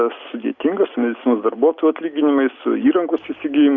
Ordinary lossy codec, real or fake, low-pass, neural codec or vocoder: Opus, 64 kbps; real; 7.2 kHz; none